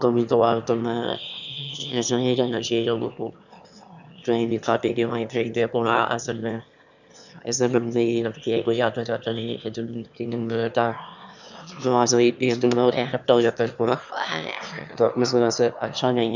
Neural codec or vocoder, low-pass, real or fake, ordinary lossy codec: autoencoder, 22.05 kHz, a latent of 192 numbers a frame, VITS, trained on one speaker; 7.2 kHz; fake; none